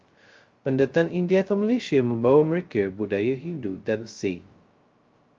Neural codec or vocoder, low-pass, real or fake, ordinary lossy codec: codec, 16 kHz, 0.2 kbps, FocalCodec; 7.2 kHz; fake; Opus, 32 kbps